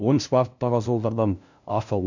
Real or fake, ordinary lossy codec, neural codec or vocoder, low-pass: fake; none; codec, 16 kHz, 0.5 kbps, FunCodec, trained on LibriTTS, 25 frames a second; 7.2 kHz